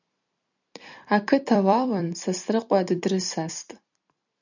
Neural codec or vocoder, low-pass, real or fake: vocoder, 44.1 kHz, 128 mel bands every 256 samples, BigVGAN v2; 7.2 kHz; fake